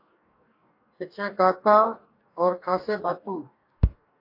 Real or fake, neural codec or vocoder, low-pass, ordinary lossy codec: fake; codec, 44.1 kHz, 2.6 kbps, DAC; 5.4 kHz; AAC, 32 kbps